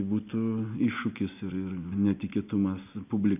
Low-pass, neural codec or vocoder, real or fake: 3.6 kHz; none; real